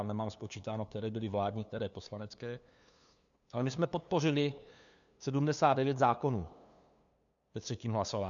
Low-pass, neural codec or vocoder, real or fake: 7.2 kHz; codec, 16 kHz, 2 kbps, FunCodec, trained on LibriTTS, 25 frames a second; fake